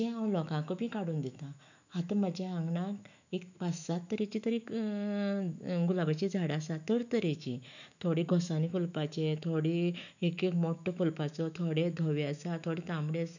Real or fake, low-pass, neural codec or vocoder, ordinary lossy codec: fake; 7.2 kHz; autoencoder, 48 kHz, 128 numbers a frame, DAC-VAE, trained on Japanese speech; none